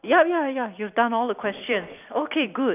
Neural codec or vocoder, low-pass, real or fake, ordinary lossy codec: vocoder, 44.1 kHz, 128 mel bands every 256 samples, BigVGAN v2; 3.6 kHz; fake; none